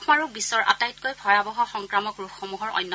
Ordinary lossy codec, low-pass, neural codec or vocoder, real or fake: none; none; none; real